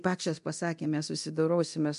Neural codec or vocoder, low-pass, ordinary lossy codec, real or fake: codec, 24 kHz, 0.9 kbps, DualCodec; 10.8 kHz; MP3, 64 kbps; fake